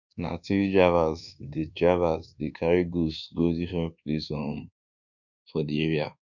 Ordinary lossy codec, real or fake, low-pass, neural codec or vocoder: none; fake; 7.2 kHz; codec, 24 kHz, 1.2 kbps, DualCodec